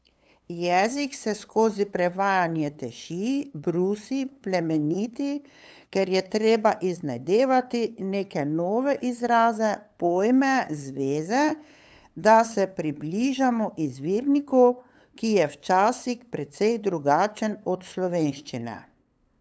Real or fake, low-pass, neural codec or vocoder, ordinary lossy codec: fake; none; codec, 16 kHz, 8 kbps, FunCodec, trained on LibriTTS, 25 frames a second; none